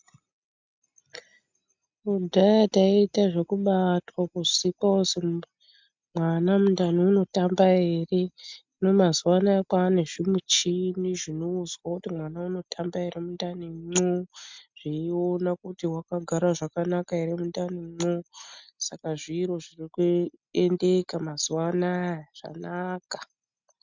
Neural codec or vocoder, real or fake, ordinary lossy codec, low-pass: none; real; MP3, 64 kbps; 7.2 kHz